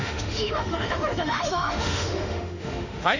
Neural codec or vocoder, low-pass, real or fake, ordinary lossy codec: autoencoder, 48 kHz, 32 numbers a frame, DAC-VAE, trained on Japanese speech; 7.2 kHz; fake; none